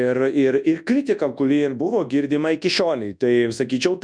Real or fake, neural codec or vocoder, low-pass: fake; codec, 24 kHz, 0.9 kbps, WavTokenizer, large speech release; 9.9 kHz